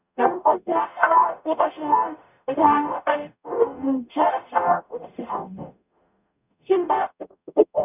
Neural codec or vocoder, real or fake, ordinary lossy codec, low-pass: codec, 44.1 kHz, 0.9 kbps, DAC; fake; none; 3.6 kHz